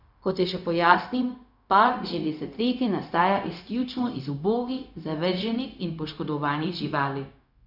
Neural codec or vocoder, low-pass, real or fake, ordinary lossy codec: codec, 16 kHz, 0.4 kbps, LongCat-Audio-Codec; 5.4 kHz; fake; none